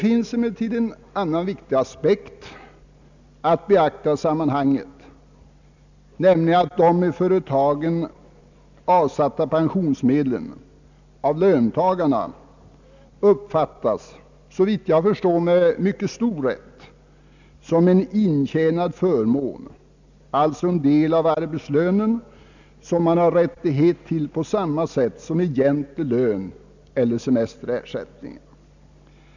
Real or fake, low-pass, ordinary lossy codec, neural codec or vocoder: real; 7.2 kHz; none; none